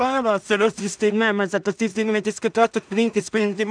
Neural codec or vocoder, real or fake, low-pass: codec, 16 kHz in and 24 kHz out, 0.4 kbps, LongCat-Audio-Codec, two codebook decoder; fake; 9.9 kHz